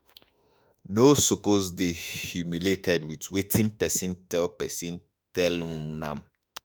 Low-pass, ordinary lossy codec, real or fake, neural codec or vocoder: none; none; fake; autoencoder, 48 kHz, 32 numbers a frame, DAC-VAE, trained on Japanese speech